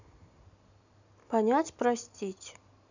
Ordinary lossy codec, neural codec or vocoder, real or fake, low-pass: none; none; real; 7.2 kHz